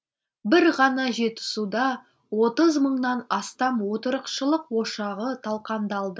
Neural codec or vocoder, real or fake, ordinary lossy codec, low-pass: none; real; none; none